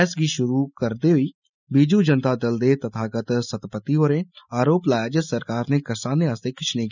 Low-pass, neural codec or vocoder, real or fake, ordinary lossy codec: 7.2 kHz; none; real; none